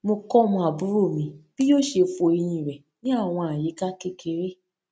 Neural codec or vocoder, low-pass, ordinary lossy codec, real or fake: none; none; none; real